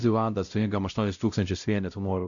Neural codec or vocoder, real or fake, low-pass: codec, 16 kHz, 0.5 kbps, X-Codec, WavLM features, trained on Multilingual LibriSpeech; fake; 7.2 kHz